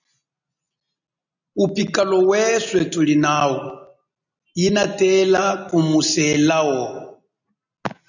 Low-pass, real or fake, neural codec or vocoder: 7.2 kHz; real; none